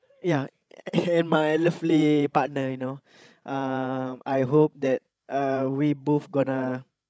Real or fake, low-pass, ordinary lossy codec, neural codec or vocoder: fake; none; none; codec, 16 kHz, 16 kbps, FreqCodec, larger model